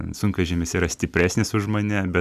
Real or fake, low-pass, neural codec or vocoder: real; 14.4 kHz; none